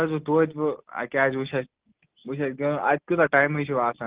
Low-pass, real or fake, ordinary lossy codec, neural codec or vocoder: 3.6 kHz; real; Opus, 16 kbps; none